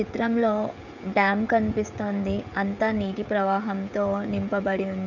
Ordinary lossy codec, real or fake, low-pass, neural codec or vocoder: none; fake; 7.2 kHz; codec, 16 kHz, 16 kbps, FreqCodec, smaller model